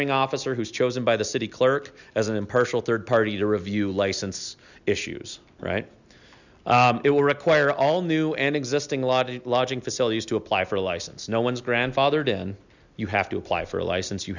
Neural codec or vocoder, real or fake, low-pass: none; real; 7.2 kHz